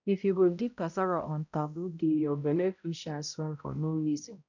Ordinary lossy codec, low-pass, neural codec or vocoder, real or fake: none; 7.2 kHz; codec, 16 kHz, 0.5 kbps, X-Codec, HuBERT features, trained on balanced general audio; fake